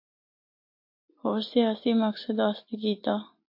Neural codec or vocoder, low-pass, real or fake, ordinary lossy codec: none; 5.4 kHz; real; MP3, 32 kbps